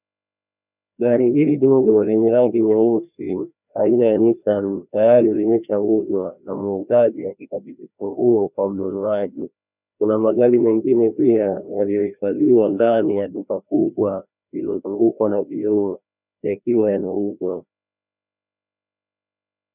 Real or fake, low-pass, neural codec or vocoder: fake; 3.6 kHz; codec, 16 kHz, 1 kbps, FreqCodec, larger model